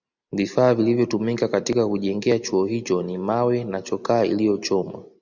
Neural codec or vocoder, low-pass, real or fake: none; 7.2 kHz; real